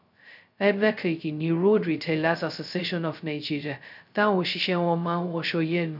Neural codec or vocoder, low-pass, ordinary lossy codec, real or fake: codec, 16 kHz, 0.2 kbps, FocalCodec; 5.4 kHz; none; fake